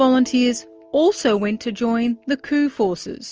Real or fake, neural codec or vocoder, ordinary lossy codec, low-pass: real; none; Opus, 24 kbps; 7.2 kHz